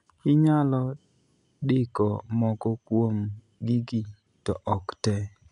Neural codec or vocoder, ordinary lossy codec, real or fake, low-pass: none; none; real; 10.8 kHz